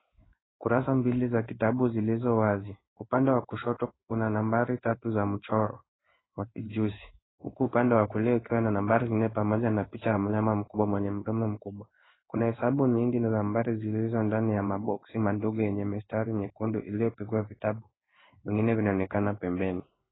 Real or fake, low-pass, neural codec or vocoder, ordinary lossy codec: fake; 7.2 kHz; codec, 16 kHz in and 24 kHz out, 1 kbps, XY-Tokenizer; AAC, 16 kbps